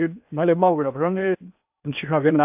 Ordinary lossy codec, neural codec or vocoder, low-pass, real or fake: AAC, 32 kbps; codec, 16 kHz in and 24 kHz out, 0.8 kbps, FocalCodec, streaming, 65536 codes; 3.6 kHz; fake